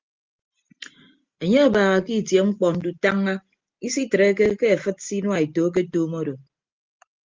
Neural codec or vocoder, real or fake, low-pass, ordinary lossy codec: none; real; 7.2 kHz; Opus, 32 kbps